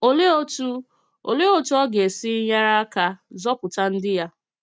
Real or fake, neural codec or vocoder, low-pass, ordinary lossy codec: real; none; none; none